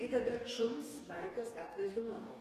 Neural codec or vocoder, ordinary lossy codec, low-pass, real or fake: codec, 44.1 kHz, 2.6 kbps, DAC; AAC, 48 kbps; 14.4 kHz; fake